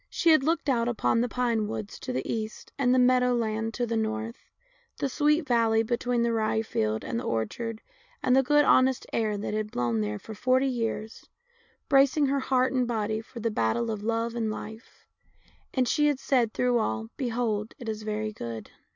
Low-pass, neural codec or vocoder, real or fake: 7.2 kHz; none; real